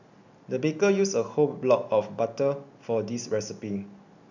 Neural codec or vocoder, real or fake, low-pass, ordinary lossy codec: none; real; 7.2 kHz; none